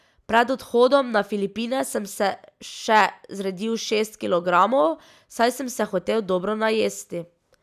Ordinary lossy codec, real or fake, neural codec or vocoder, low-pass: none; real; none; 14.4 kHz